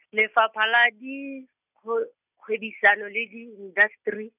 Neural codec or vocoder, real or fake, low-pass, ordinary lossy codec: none; real; 3.6 kHz; none